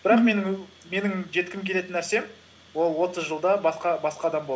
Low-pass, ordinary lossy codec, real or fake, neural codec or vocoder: none; none; real; none